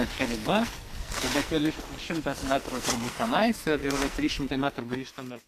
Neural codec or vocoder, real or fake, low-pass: codec, 44.1 kHz, 2.6 kbps, SNAC; fake; 14.4 kHz